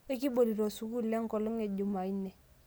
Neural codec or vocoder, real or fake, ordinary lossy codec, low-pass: none; real; none; none